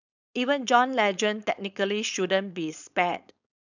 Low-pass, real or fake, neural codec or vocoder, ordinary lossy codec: 7.2 kHz; fake; codec, 16 kHz, 4.8 kbps, FACodec; none